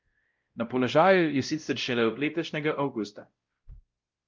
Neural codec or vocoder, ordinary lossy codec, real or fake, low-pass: codec, 16 kHz, 0.5 kbps, X-Codec, WavLM features, trained on Multilingual LibriSpeech; Opus, 32 kbps; fake; 7.2 kHz